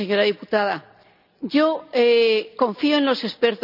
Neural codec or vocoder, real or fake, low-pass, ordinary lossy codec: none; real; 5.4 kHz; none